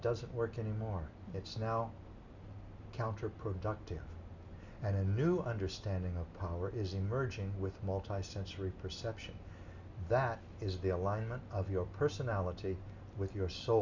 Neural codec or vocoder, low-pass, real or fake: none; 7.2 kHz; real